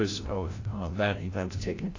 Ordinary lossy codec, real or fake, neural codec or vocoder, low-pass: AAC, 32 kbps; fake; codec, 16 kHz, 0.5 kbps, FreqCodec, larger model; 7.2 kHz